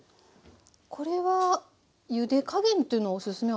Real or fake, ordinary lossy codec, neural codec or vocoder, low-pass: real; none; none; none